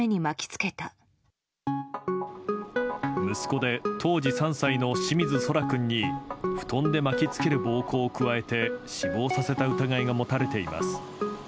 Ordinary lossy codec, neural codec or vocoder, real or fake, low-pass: none; none; real; none